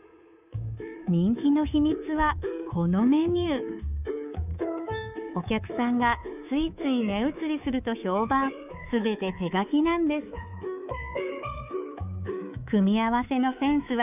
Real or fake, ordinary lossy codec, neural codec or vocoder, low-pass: fake; none; codec, 24 kHz, 3.1 kbps, DualCodec; 3.6 kHz